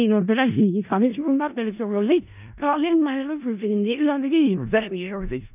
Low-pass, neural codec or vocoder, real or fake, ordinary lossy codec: 3.6 kHz; codec, 16 kHz in and 24 kHz out, 0.4 kbps, LongCat-Audio-Codec, four codebook decoder; fake; none